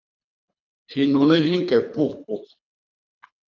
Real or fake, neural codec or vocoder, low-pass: fake; codec, 24 kHz, 3 kbps, HILCodec; 7.2 kHz